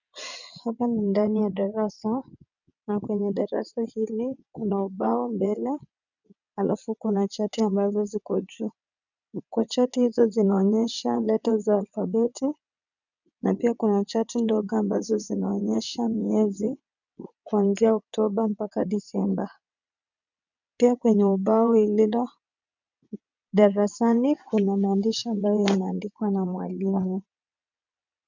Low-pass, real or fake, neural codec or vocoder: 7.2 kHz; fake; vocoder, 22.05 kHz, 80 mel bands, WaveNeXt